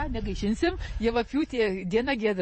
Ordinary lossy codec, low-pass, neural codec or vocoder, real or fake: MP3, 32 kbps; 9.9 kHz; vocoder, 48 kHz, 128 mel bands, Vocos; fake